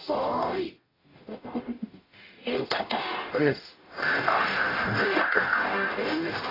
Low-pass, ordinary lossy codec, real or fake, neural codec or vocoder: 5.4 kHz; AAC, 24 kbps; fake; codec, 44.1 kHz, 0.9 kbps, DAC